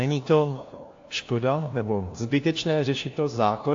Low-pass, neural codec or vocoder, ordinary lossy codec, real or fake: 7.2 kHz; codec, 16 kHz, 1 kbps, FunCodec, trained on LibriTTS, 50 frames a second; AAC, 48 kbps; fake